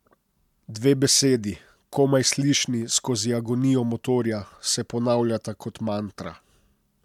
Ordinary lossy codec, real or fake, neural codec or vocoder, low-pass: MP3, 96 kbps; real; none; 19.8 kHz